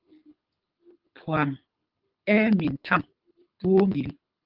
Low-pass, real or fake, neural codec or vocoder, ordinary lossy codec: 5.4 kHz; fake; codec, 24 kHz, 6 kbps, HILCodec; Opus, 32 kbps